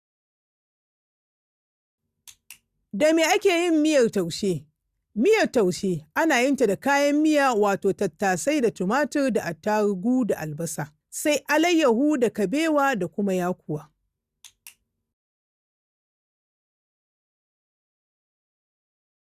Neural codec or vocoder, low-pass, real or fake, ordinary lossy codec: none; 14.4 kHz; real; Opus, 64 kbps